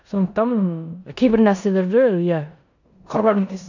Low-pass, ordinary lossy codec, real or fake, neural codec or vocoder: 7.2 kHz; none; fake; codec, 16 kHz in and 24 kHz out, 0.9 kbps, LongCat-Audio-Codec, four codebook decoder